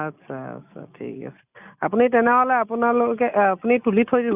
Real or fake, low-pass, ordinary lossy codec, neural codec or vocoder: real; 3.6 kHz; none; none